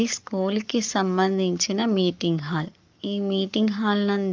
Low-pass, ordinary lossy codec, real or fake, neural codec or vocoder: 7.2 kHz; Opus, 32 kbps; fake; codec, 44.1 kHz, 7.8 kbps, Pupu-Codec